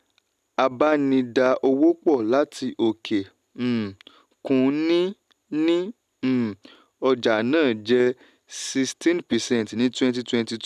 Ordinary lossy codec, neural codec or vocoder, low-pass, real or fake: none; none; 14.4 kHz; real